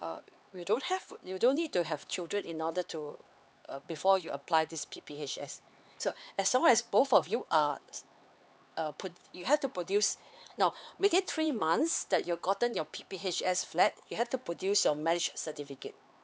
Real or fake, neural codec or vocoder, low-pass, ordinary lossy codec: fake; codec, 16 kHz, 4 kbps, X-Codec, HuBERT features, trained on LibriSpeech; none; none